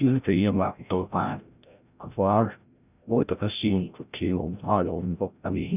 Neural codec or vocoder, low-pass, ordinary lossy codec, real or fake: codec, 16 kHz, 0.5 kbps, FreqCodec, larger model; 3.6 kHz; none; fake